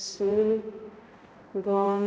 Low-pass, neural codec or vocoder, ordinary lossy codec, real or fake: none; codec, 16 kHz, 0.5 kbps, X-Codec, HuBERT features, trained on balanced general audio; none; fake